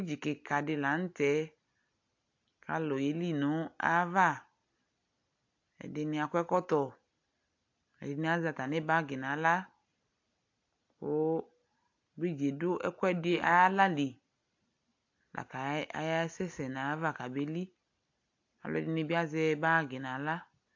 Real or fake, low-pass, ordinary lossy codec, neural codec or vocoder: real; 7.2 kHz; AAC, 48 kbps; none